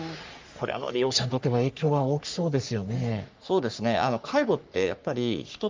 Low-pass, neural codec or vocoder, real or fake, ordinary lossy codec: 7.2 kHz; codec, 44.1 kHz, 3.4 kbps, Pupu-Codec; fake; Opus, 32 kbps